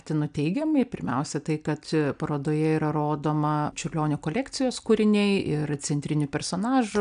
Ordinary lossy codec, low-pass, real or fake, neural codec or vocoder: AAC, 96 kbps; 9.9 kHz; real; none